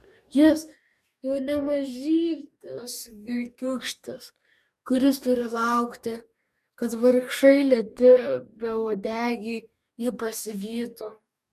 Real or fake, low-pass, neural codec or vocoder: fake; 14.4 kHz; codec, 44.1 kHz, 2.6 kbps, DAC